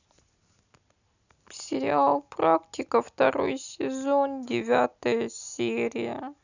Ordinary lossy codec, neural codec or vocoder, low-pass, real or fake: none; none; 7.2 kHz; real